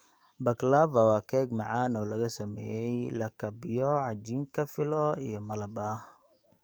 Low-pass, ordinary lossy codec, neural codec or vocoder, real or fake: none; none; codec, 44.1 kHz, 7.8 kbps, DAC; fake